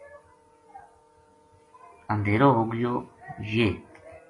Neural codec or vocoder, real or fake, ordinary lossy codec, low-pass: none; real; AAC, 32 kbps; 10.8 kHz